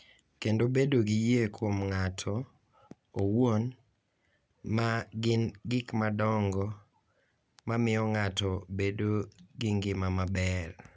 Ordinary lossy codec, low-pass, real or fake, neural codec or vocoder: none; none; real; none